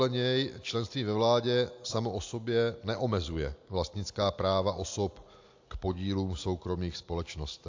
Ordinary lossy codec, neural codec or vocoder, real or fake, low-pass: AAC, 48 kbps; none; real; 7.2 kHz